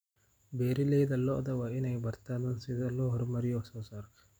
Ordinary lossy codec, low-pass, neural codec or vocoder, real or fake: none; none; none; real